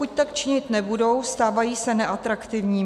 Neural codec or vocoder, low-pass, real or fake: none; 14.4 kHz; real